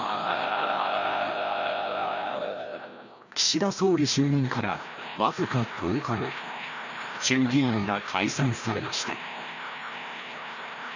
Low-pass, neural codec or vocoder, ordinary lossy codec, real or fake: 7.2 kHz; codec, 16 kHz, 1 kbps, FreqCodec, larger model; none; fake